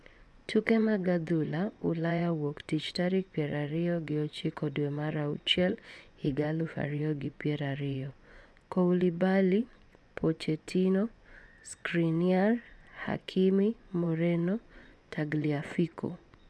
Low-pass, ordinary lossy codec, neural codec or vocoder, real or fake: none; none; vocoder, 24 kHz, 100 mel bands, Vocos; fake